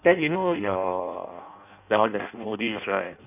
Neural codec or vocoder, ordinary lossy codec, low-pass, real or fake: codec, 16 kHz in and 24 kHz out, 0.6 kbps, FireRedTTS-2 codec; none; 3.6 kHz; fake